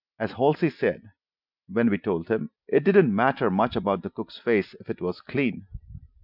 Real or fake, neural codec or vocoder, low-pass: real; none; 5.4 kHz